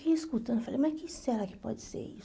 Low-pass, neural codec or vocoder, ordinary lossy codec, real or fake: none; none; none; real